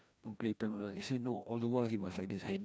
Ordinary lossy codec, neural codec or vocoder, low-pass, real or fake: none; codec, 16 kHz, 1 kbps, FreqCodec, larger model; none; fake